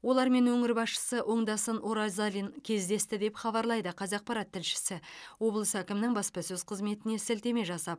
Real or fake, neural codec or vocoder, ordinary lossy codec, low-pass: real; none; none; none